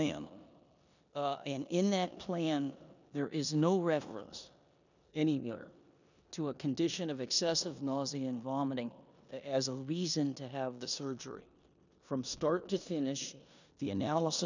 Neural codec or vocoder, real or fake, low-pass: codec, 16 kHz in and 24 kHz out, 0.9 kbps, LongCat-Audio-Codec, four codebook decoder; fake; 7.2 kHz